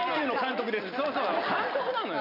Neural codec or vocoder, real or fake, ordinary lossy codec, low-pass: none; real; none; 5.4 kHz